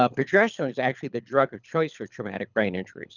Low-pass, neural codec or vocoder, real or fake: 7.2 kHz; codec, 24 kHz, 6 kbps, HILCodec; fake